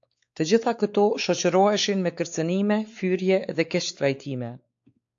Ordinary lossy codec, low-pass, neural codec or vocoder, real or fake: AAC, 64 kbps; 7.2 kHz; codec, 16 kHz, 4 kbps, X-Codec, WavLM features, trained on Multilingual LibriSpeech; fake